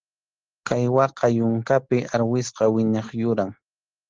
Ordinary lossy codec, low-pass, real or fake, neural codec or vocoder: Opus, 16 kbps; 7.2 kHz; real; none